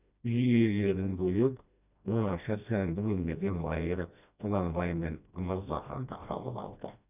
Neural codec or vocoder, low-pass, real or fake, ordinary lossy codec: codec, 16 kHz, 1 kbps, FreqCodec, smaller model; 3.6 kHz; fake; none